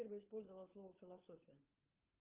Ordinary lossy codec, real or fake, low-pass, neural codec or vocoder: Opus, 24 kbps; fake; 3.6 kHz; codec, 16 kHz, 16 kbps, FunCodec, trained on LibriTTS, 50 frames a second